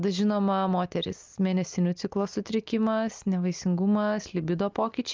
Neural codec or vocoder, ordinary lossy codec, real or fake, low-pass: none; Opus, 24 kbps; real; 7.2 kHz